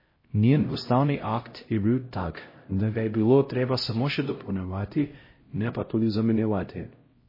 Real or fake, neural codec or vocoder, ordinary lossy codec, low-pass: fake; codec, 16 kHz, 0.5 kbps, X-Codec, HuBERT features, trained on LibriSpeech; MP3, 24 kbps; 5.4 kHz